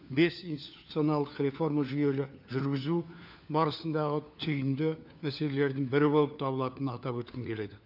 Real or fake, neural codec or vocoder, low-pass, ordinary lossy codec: fake; codec, 16 kHz, 4 kbps, FunCodec, trained on LibriTTS, 50 frames a second; 5.4 kHz; none